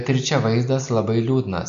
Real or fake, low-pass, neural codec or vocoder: real; 7.2 kHz; none